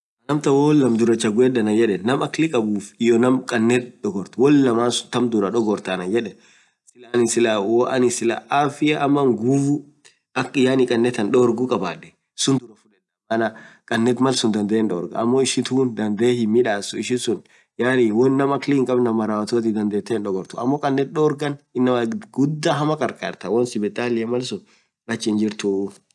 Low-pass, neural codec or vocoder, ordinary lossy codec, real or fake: none; none; none; real